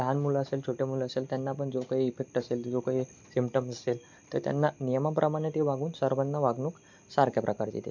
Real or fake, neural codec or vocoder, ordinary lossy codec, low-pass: real; none; MP3, 64 kbps; 7.2 kHz